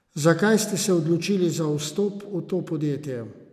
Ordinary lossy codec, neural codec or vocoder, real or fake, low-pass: none; none; real; 14.4 kHz